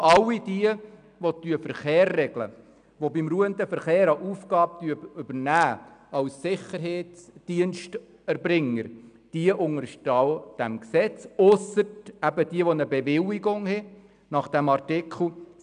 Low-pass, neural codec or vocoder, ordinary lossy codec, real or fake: 9.9 kHz; none; none; real